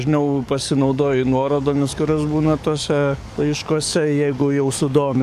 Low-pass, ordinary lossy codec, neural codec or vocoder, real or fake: 14.4 kHz; AAC, 96 kbps; codec, 44.1 kHz, 7.8 kbps, DAC; fake